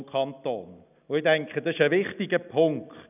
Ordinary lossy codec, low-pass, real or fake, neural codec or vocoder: none; 3.6 kHz; real; none